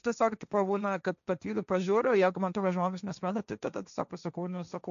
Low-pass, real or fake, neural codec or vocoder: 7.2 kHz; fake; codec, 16 kHz, 1.1 kbps, Voila-Tokenizer